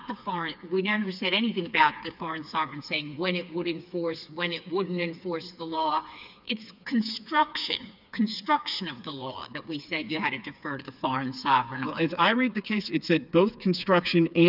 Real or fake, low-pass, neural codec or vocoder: fake; 5.4 kHz; codec, 16 kHz, 4 kbps, FreqCodec, smaller model